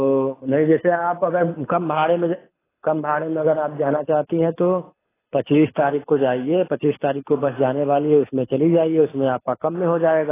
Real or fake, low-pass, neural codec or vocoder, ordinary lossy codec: fake; 3.6 kHz; codec, 24 kHz, 6 kbps, HILCodec; AAC, 16 kbps